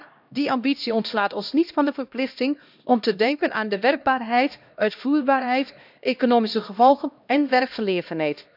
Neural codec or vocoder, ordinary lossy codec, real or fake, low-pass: codec, 16 kHz, 1 kbps, X-Codec, HuBERT features, trained on LibriSpeech; none; fake; 5.4 kHz